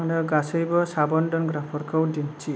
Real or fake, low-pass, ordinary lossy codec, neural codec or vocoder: real; none; none; none